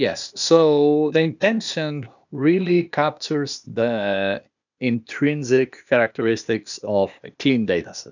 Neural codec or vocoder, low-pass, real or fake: codec, 16 kHz, 0.8 kbps, ZipCodec; 7.2 kHz; fake